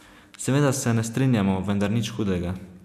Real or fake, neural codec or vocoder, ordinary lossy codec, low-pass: real; none; none; 14.4 kHz